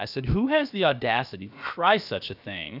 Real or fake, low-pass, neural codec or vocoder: fake; 5.4 kHz; codec, 16 kHz, about 1 kbps, DyCAST, with the encoder's durations